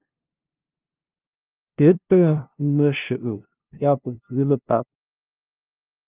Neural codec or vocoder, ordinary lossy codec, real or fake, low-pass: codec, 16 kHz, 0.5 kbps, FunCodec, trained on LibriTTS, 25 frames a second; Opus, 24 kbps; fake; 3.6 kHz